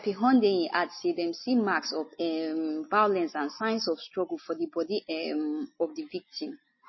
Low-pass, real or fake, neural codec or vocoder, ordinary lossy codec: 7.2 kHz; real; none; MP3, 24 kbps